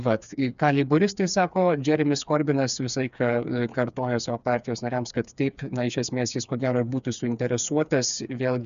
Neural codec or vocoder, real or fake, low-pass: codec, 16 kHz, 4 kbps, FreqCodec, smaller model; fake; 7.2 kHz